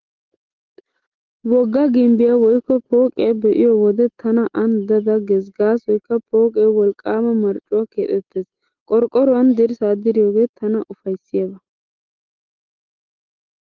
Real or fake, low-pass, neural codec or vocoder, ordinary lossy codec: real; 7.2 kHz; none; Opus, 16 kbps